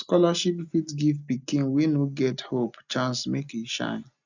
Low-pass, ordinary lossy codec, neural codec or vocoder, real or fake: 7.2 kHz; none; none; real